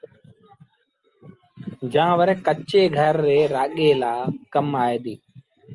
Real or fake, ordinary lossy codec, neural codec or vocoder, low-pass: real; Opus, 32 kbps; none; 10.8 kHz